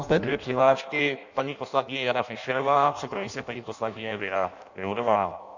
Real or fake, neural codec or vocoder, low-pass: fake; codec, 16 kHz in and 24 kHz out, 0.6 kbps, FireRedTTS-2 codec; 7.2 kHz